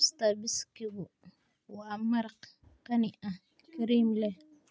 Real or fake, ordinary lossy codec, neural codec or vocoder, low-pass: real; none; none; none